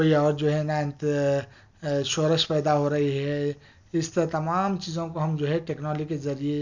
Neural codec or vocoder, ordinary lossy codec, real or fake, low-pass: none; none; real; 7.2 kHz